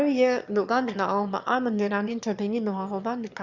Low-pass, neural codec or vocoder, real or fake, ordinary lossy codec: 7.2 kHz; autoencoder, 22.05 kHz, a latent of 192 numbers a frame, VITS, trained on one speaker; fake; Opus, 64 kbps